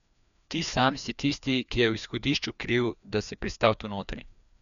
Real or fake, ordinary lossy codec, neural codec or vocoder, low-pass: fake; none; codec, 16 kHz, 2 kbps, FreqCodec, larger model; 7.2 kHz